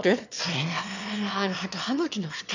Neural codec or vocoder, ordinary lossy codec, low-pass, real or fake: autoencoder, 22.05 kHz, a latent of 192 numbers a frame, VITS, trained on one speaker; none; 7.2 kHz; fake